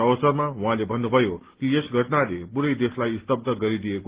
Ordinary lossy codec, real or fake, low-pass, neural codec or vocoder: Opus, 16 kbps; real; 3.6 kHz; none